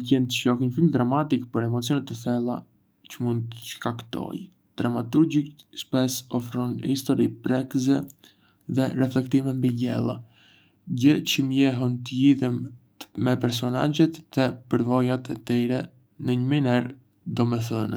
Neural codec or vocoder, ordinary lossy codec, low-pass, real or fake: codec, 44.1 kHz, 7.8 kbps, DAC; none; none; fake